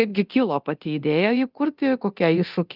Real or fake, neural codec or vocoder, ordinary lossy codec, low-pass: fake; codec, 24 kHz, 0.9 kbps, DualCodec; Opus, 24 kbps; 5.4 kHz